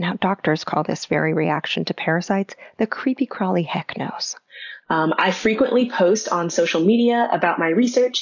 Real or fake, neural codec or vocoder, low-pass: real; none; 7.2 kHz